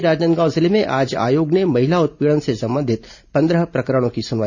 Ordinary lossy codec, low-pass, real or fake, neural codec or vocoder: none; 7.2 kHz; real; none